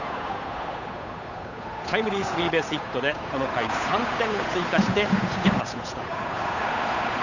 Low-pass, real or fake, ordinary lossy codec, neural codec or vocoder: 7.2 kHz; fake; none; codec, 16 kHz, 8 kbps, FunCodec, trained on Chinese and English, 25 frames a second